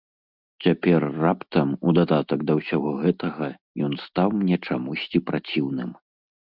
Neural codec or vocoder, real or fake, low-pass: none; real; 5.4 kHz